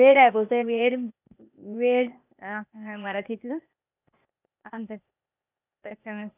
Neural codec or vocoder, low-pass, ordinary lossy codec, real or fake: codec, 16 kHz, 0.8 kbps, ZipCodec; 3.6 kHz; none; fake